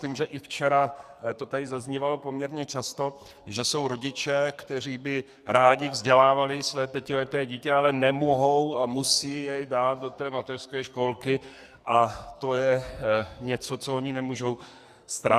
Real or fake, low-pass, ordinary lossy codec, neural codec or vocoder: fake; 14.4 kHz; Opus, 64 kbps; codec, 44.1 kHz, 2.6 kbps, SNAC